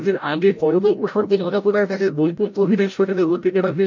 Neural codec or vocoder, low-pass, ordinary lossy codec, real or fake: codec, 16 kHz, 0.5 kbps, FreqCodec, larger model; 7.2 kHz; none; fake